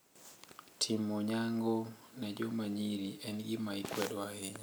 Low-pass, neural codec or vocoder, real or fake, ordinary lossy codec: none; none; real; none